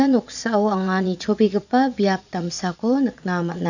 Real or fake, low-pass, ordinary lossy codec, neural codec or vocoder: fake; 7.2 kHz; none; vocoder, 22.05 kHz, 80 mel bands, Vocos